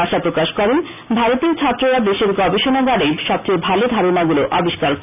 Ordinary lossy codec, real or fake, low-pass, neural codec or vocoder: none; real; 3.6 kHz; none